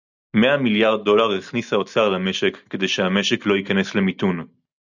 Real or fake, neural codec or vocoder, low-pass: real; none; 7.2 kHz